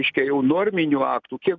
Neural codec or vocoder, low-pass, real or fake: none; 7.2 kHz; real